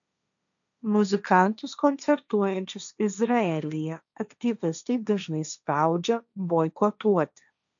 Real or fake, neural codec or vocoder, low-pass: fake; codec, 16 kHz, 1.1 kbps, Voila-Tokenizer; 7.2 kHz